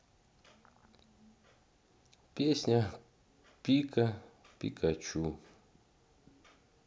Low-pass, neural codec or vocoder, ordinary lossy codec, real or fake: none; none; none; real